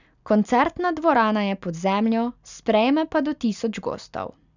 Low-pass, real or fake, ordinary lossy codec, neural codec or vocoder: 7.2 kHz; real; none; none